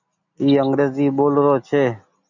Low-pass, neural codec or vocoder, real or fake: 7.2 kHz; none; real